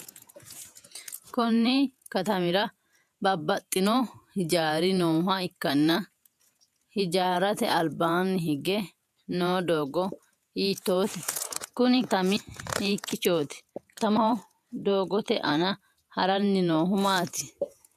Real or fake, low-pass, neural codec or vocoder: fake; 14.4 kHz; vocoder, 48 kHz, 128 mel bands, Vocos